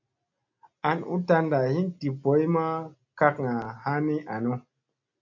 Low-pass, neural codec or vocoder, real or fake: 7.2 kHz; none; real